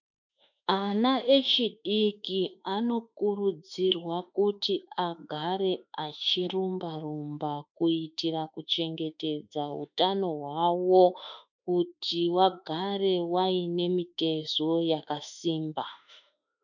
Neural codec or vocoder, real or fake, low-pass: autoencoder, 48 kHz, 32 numbers a frame, DAC-VAE, trained on Japanese speech; fake; 7.2 kHz